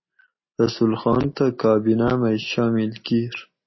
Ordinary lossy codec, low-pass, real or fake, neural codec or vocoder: MP3, 24 kbps; 7.2 kHz; real; none